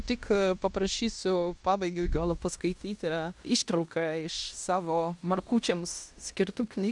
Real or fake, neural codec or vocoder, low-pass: fake; codec, 16 kHz in and 24 kHz out, 0.9 kbps, LongCat-Audio-Codec, fine tuned four codebook decoder; 10.8 kHz